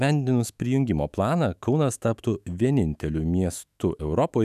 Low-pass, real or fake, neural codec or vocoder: 14.4 kHz; fake; autoencoder, 48 kHz, 128 numbers a frame, DAC-VAE, trained on Japanese speech